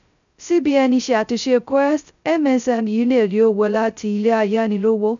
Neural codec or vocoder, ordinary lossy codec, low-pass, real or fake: codec, 16 kHz, 0.2 kbps, FocalCodec; none; 7.2 kHz; fake